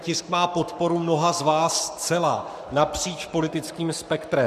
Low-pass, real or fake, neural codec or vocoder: 14.4 kHz; fake; codec, 44.1 kHz, 7.8 kbps, Pupu-Codec